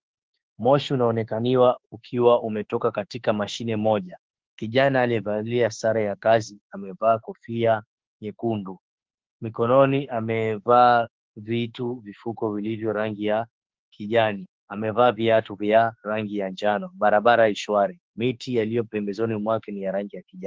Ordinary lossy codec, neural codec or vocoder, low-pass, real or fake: Opus, 16 kbps; autoencoder, 48 kHz, 32 numbers a frame, DAC-VAE, trained on Japanese speech; 7.2 kHz; fake